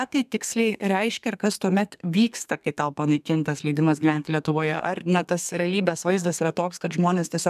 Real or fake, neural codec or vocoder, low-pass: fake; codec, 32 kHz, 1.9 kbps, SNAC; 14.4 kHz